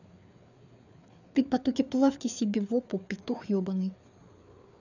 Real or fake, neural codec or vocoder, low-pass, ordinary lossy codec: fake; codec, 16 kHz, 4 kbps, FreqCodec, larger model; 7.2 kHz; none